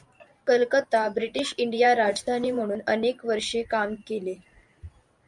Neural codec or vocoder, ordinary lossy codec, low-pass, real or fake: vocoder, 44.1 kHz, 128 mel bands every 512 samples, BigVGAN v2; MP3, 96 kbps; 10.8 kHz; fake